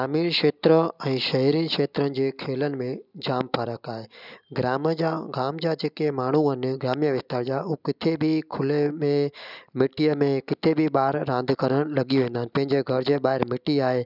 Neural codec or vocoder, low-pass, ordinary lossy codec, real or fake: none; 5.4 kHz; none; real